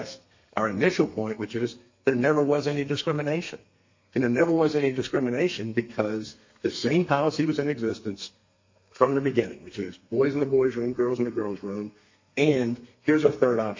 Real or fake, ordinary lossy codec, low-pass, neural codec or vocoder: fake; MP3, 32 kbps; 7.2 kHz; codec, 32 kHz, 1.9 kbps, SNAC